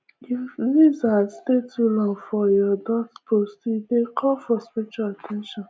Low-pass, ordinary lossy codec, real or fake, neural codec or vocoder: none; none; real; none